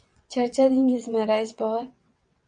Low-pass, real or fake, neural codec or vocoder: 9.9 kHz; fake; vocoder, 22.05 kHz, 80 mel bands, WaveNeXt